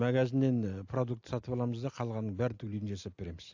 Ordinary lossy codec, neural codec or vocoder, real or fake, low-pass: AAC, 48 kbps; none; real; 7.2 kHz